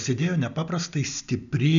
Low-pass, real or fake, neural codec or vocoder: 7.2 kHz; real; none